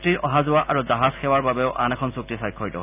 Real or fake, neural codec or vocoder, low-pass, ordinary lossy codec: real; none; 3.6 kHz; none